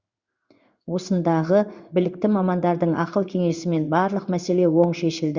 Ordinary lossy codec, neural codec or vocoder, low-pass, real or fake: Opus, 64 kbps; codec, 16 kHz in and 24 kHz out, 1 kbps, XY-Tokenizer; 7.2 kHz; fake